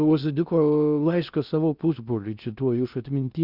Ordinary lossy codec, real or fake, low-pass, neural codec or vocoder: Opus, 64 kbps; fake; 5.4 kHz; codec, 16 kHz in and 24 kHz out, 0.6 kbps, FocalCodec, streaming, 2048 codes